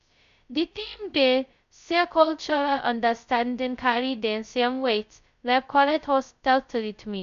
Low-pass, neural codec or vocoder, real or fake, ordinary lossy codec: 7.2 kHz; codec, 16 kHz, 0.2 kbps, FocalCodec; fake; MP3, 64 kbps